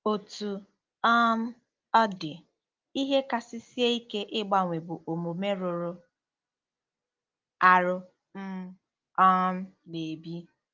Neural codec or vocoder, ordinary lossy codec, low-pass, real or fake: none; Opus, 24 kbps; 7.2 kHz; real